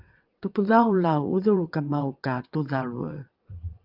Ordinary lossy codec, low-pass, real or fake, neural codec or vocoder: Opus, 24 kbps; 5.4 kHz; fake; vocoder, 22.05 kHz, 80 mel bands, WaveNeXt